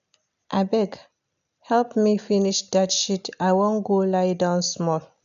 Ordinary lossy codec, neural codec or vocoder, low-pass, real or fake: none; none; 7.2 kHz; real